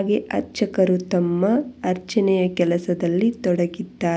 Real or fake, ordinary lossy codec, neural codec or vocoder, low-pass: real; none; none; none